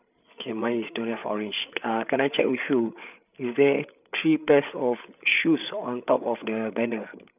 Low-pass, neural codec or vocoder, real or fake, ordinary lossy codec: 3.6 kHz; codec, 16 kHz, 8 kbps, FreqCodec, larger model; fake; none